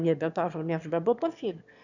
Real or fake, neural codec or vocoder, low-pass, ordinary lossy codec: fake; autoencoder, 22.05 kHz, a latent of 192 numbers a frame, VITS, trained on one speaker; 7.2 kHz; none